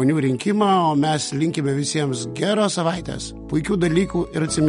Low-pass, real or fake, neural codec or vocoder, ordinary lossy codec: 19.8 kHz; real; none; MP3, 48 kbps